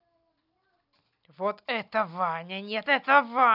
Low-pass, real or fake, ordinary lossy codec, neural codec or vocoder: 5.4 kHz; real; none; none